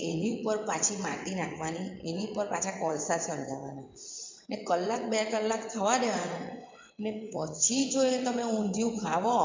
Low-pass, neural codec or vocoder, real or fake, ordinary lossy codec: 7.2 kHz; vocoder, 22.05 kHz, 80 mel bands, WaveNeXt; fake; MP3, 64 kbps